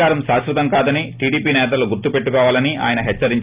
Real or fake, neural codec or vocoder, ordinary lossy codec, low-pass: real; none; Opus, 32 kbps; 3.6 kHz